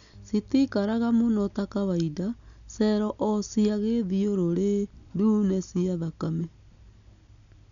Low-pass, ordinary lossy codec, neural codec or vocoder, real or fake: 7.2 kHz; none; none; real